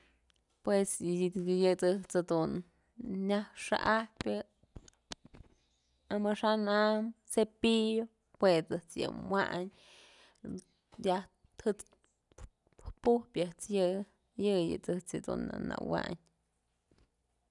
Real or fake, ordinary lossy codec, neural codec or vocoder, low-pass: real; none; none; 10.8 kHz